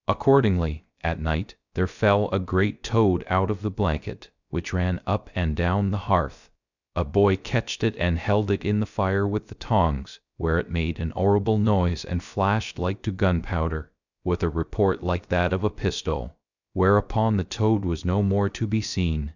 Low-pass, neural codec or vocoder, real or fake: 7.2 kHz; codec, 16 kHz, 0.3 kbps, FocalCodec; fake